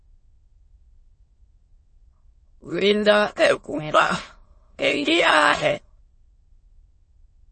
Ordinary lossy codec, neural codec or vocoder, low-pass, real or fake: MP3, 32 kbps; autoencoder, 22.05 kHz, a latent of 192 numbers a frame, VITS, trained on many speakers; 9.9 kHz; fake